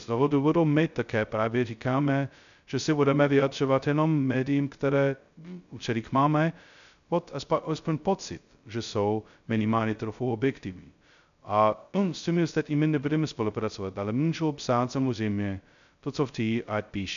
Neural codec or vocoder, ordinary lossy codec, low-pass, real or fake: codec, 16 kHz, 0.2 kbps, FocalCodec; MP3, 96 kbps; 7.2 kHz; fake